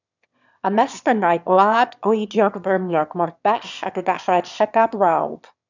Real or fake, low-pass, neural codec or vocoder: fake; 7.2 kHz; autoencoder, 22.05 kHz, a latent of 192 numbers a frame, VITS, trained on one speaker